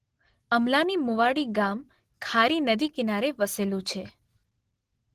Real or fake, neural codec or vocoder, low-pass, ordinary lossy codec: real; none; 14.4 kHz; Opus, 16 kbps